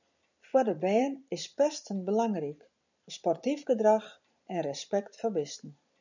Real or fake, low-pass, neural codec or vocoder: fake; 7.2 kHz; vocoder, 44.1 kHz, 128 mel bands every 256 samples, BigVGAN v2